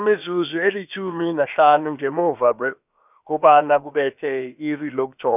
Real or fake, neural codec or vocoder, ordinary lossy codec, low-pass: fake; codec, 16 kHz, about 1 kbps, DyCAST, with the encoder's durations; none; 3.6 kHz